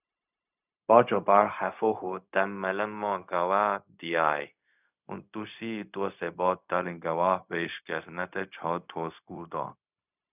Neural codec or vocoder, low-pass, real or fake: codec, 16 kHz, 0.4 kbps, LongCat-Audio-Codec; 3.6 kHz; fake